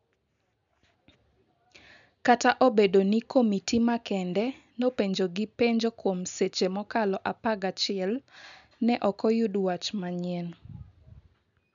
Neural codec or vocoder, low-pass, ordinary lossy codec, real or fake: none; 7.2 kHz; MP3, 96 kbps; real